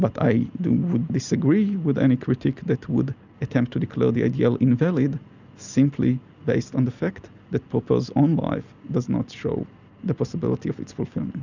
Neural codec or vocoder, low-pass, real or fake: none; 7.2 kHz; real